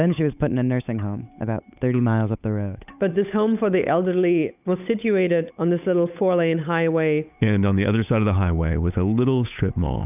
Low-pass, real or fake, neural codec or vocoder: 3.6 kHz; fake; codec, 16 kHz, 8 kbps, FunCodec, trained on Chinese and English, 25 frames a second